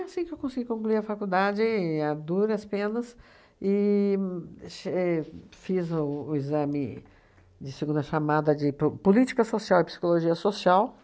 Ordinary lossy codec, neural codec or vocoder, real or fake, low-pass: none; none; real; none